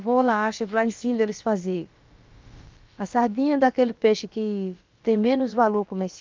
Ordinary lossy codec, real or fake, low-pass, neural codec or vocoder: Opus, 32 kbps; fake; 7.2 kHz; codec, 16 kHz, about 1 kbps, DyCAST, with the encoder's durations